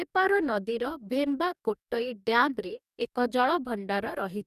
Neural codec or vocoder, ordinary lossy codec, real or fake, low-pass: codec, 44.1 kHz, 2.6 kbps, DAC; none; fake; 14.4 kHz